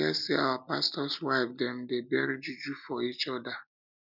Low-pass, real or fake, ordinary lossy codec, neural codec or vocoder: 5.4 kHz; real; none; none